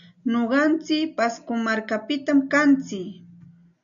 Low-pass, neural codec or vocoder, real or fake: 7.2 kHz; none; real